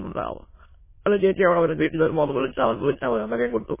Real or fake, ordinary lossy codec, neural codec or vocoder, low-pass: fake; MP3, 16 kbps; autoencoder, 22.05 kHz, a latent of 192 numbers a frame, VITS, trained on many speakers; 3.6 kHz